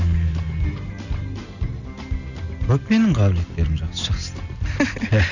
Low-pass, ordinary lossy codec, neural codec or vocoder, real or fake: 7.2 kHz; none; none; real